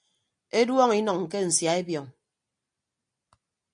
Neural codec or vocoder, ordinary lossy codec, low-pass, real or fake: none; MP3, 64 kbps; 9.9 kHz; real